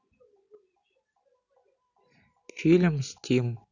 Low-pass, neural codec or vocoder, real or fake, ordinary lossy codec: 7.2 kHz; none; real; none